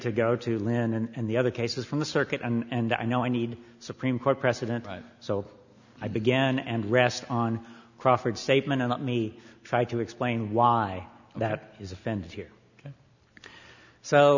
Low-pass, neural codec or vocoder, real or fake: 7.2 kHz; none; real